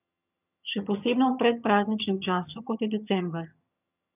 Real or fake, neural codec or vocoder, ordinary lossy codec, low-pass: fake; vocoder, 22.05 kHz, 80 mel bands, HiFi-GAN; none; 3.6 kHz